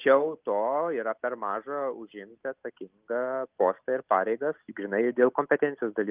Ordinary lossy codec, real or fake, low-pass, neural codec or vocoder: Opus, 32 kbps; real; 3.6 kHz; none